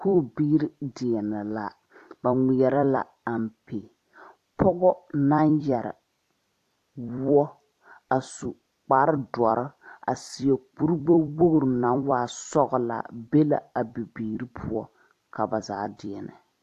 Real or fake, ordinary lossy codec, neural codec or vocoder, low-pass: fake; AAC, 64 kbps; vocoder, 44.1 kHz, 128 mel bands every 256 samples, BigVGAN v2; 14.4 kHz